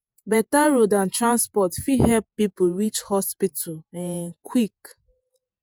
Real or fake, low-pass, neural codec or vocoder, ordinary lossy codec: fake; none; vocoder, 48 kHz, 128 mel bands, Vocos; none